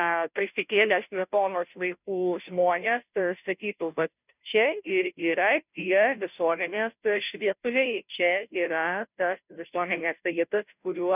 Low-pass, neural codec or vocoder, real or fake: 3.6 kHz; codec, 16 kHz, 0.5 kbps, FunCodec, trained on Chinese and English, 25 frames a second; fake